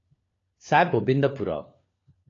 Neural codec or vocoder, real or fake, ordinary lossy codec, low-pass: codec, 16 kHz, 4 kbps, FunCodec, trained on LibriTTS, 50 frames a second; fake; AAC, 32 kbps; 7.2 kHz